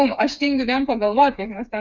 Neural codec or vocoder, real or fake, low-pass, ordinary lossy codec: codec, 16 kHz, 4 kbps, FreqCodec, smaller model; fake; 7.2 kHz; Opus, 64 kbps